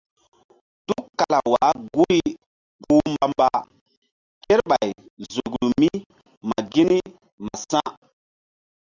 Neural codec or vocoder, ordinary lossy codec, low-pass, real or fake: none; Opus, 64 kbps; 7.2 kHz; real